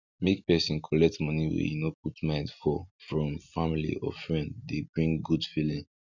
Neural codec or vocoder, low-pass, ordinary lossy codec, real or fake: none; 7.2 kHz; none; real